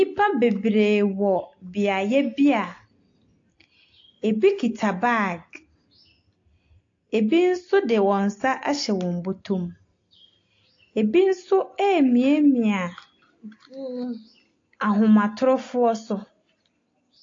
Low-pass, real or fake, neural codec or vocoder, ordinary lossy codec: 7.2 kHz; real; none; AAC, 48 kbps